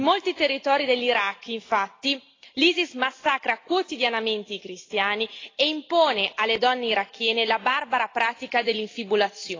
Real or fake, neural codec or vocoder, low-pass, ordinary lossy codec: real; none; 7.2 kHz; AAC, 32 kbps